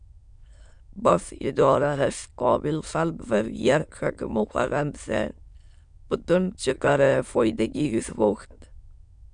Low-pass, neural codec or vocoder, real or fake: 9.9 kHz; autoencoder, 22.05 kHz, a latent of 192 numbers a frame, VITS, trained on many speakers; fake